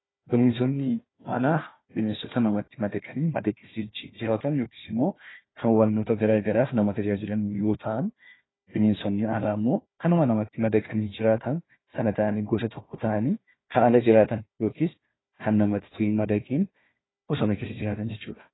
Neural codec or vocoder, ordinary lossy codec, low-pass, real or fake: codec, 16 kHz, 1 kbps, FunCodec, trained on Chinese and English, 50 frames a second; AAC, 16 kbps; 7.2 kHz; fake